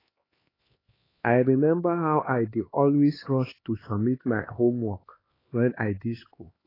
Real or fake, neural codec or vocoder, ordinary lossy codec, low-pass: fake; codec, 16 kHz, 2 kbps, X-Codec, HuBERT features, trained on LibriSpeech; AAC, 24 kbps; 5.4 kHz